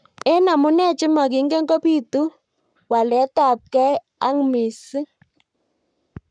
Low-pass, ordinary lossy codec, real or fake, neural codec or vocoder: 9.9 kHz; none; fake; codec, 44.1 kHz, 7.8 kbps, Pupu-Codec